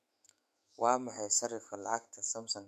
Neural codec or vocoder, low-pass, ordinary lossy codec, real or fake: autoencoder, 48 kHz, 128 numbers a frame, DAC-VAE, trained on Japanese speech; 14.4 kHz; AAC, 96 kbps; fake